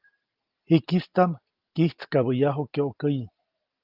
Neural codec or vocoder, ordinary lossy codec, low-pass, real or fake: none; Opus, 24 kbps; 5.4 kHz; real